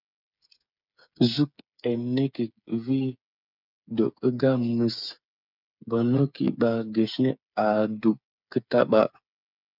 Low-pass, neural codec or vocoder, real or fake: 5.4 kHz; codec, 16 kHz, 8 kbps, FreqCodec, smaller model; fake